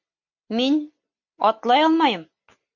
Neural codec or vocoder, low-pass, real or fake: none; 7.2 kHz; real